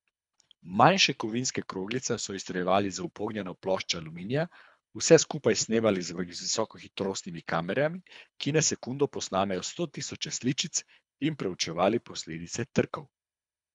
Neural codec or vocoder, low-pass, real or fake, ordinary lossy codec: codec, 24 kHz, 3 kbps, HILCodec; 10.8 kHz; fake; none